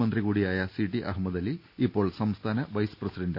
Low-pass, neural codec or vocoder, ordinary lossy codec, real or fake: 5.4 kHz; none; none; real